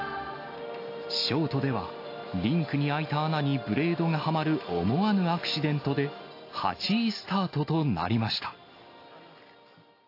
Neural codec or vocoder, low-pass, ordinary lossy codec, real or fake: none; 5.4 kHz; AAC, 32 kbps; real